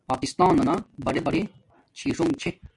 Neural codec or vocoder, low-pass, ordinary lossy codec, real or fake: none; 10.8 kHz; MP3, 64 kbps; real